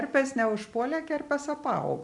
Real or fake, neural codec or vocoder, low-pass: real; none; 10.8 kHz